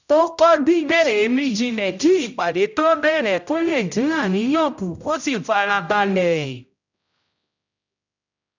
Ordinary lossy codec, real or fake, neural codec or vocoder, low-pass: none; fake; codec, 16 kHz, 0.5 kbps, X-Codec, HuBERT features, trained on general audio; 7.2 kHz